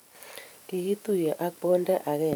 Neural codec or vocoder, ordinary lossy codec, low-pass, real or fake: vocoder, 44.1 kHz, 128 mel bands every 512 samples, BigVGAN v2; none; none; fake